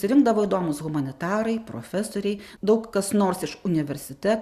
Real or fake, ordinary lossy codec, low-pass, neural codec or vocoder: real; Opus, 64 kbps; 14.4 kHz; none